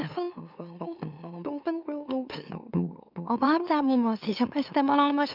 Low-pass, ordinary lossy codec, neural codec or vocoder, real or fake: 5.4 kHz; none; autoencoder, 44.1 kHz, a latent of 192 numbers a frame, MeloTTS; fake